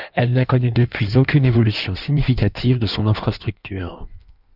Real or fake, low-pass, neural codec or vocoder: fake; 5.4 kHz; codec, 16 kHz in and 24 kHz out, 1.1 kbps, FireRedTTS-2 codec